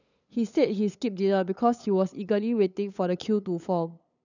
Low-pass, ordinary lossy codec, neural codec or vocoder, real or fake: 7.2 kHz; none; codec, 16 kHz, 8 kbps, FunCodec, trained on LibriTTS, 25 frames a second; fake